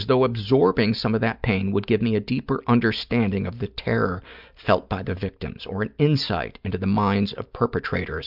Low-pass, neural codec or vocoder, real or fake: 5.4 kHz; none; real